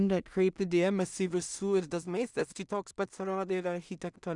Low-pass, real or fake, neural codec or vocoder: 10.8 kHz; fake; codec, 16 kHz in and 24 kHz out, 0.4 kbps, LongCat-Audio-Codec, two codebook decoder